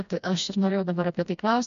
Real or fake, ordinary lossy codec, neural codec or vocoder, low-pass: fake; AAC, 96 kbps; codec, 16 kHz, 1 kbps, FreqCodec, smaller model; 7.2 kHz